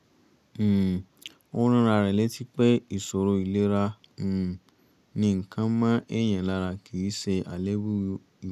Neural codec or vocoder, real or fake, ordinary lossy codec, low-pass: none; real; none; 14.4 kHz